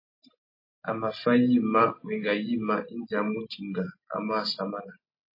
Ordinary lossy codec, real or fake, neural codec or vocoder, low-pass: MP3, 24 kbps; real; none; 5.4 kHz